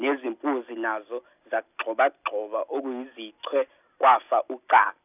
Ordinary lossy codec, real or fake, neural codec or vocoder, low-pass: none; real; none; 3.6 kHz